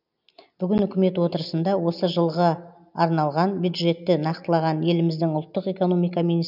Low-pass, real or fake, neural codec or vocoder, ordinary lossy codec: 5.4 kHz; real; none; none